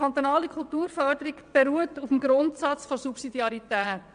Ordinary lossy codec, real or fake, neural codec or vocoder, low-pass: AAC, 96 kbps; fake; vocoder, 22.05 kHz, 80 mel bands, WaveNeXt; 9.9 kHz